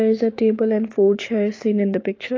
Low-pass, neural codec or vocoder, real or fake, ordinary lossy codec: 7.2 kHz; codec, 16 kHz, 6 kbps, DAC; fake; AAC, 32 kbps